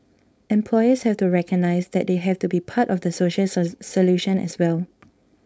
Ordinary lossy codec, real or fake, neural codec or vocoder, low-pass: none; fake; codec, 16 kHz, 4.8 kbps, FACodec; none